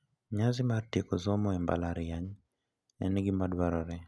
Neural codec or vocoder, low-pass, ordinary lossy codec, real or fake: none; none; none; real